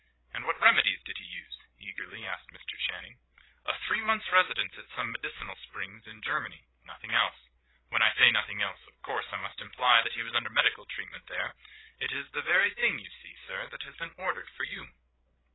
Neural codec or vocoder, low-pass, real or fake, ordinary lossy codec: codec, 16 kHz, 16 kbps, FreqCodec, larger model; 7.2 kHz; fake; AAC, 16 kbps